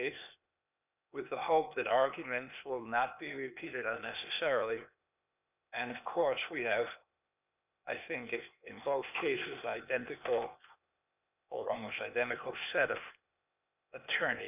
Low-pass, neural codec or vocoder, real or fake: 3.6 kHz; codec, 16 kHz, 0.8 kbps, ZipCodec; fake